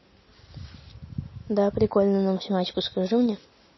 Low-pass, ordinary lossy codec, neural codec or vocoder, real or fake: 7.2 kHz; MP3, 24 kbps; none; real